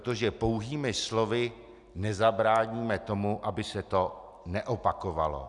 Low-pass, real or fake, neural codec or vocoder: 10.8 kHz; real; none